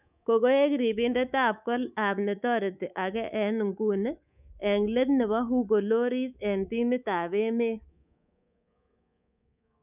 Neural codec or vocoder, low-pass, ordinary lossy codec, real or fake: codec, 24 kHz, 3.1 kbps, DualCodec; 3.6 kHz; none; fake